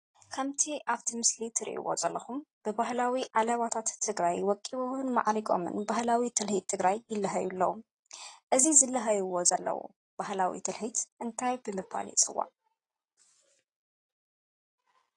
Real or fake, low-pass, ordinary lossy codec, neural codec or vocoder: real; 10.8 kHz; AAC, 32 kbps; none